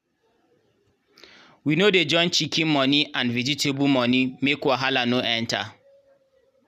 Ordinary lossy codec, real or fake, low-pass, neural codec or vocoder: none; real; 14.4 kHz; none